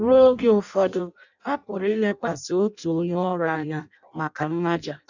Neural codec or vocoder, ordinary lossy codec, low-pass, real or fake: codec, 16 kHz in and 24 kHz out, 0.6 kbps, FireRedTTS-2 codec; none; 7.2 kHz; fake